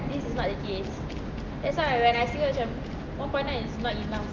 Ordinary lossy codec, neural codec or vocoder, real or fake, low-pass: Opus, 24 kbps; none; real; 7.2 kHz